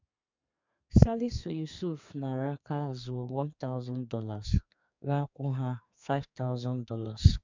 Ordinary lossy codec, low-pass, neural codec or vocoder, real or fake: MP3, 64 kbps; 7.2 kHz; codec, 32 kHz, 1.9 kbps, SNAC; fake